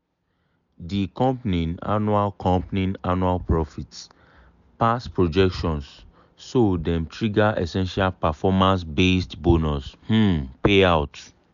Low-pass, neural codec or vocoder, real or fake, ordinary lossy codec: 7.2 kHz; none; real; none